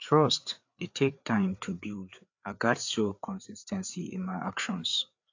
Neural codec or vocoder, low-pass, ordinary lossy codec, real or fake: codec, 16 kHz, 4 kbps, FreqCodec, larger model; 7.2 kHz; AAC, 48 kbps; fake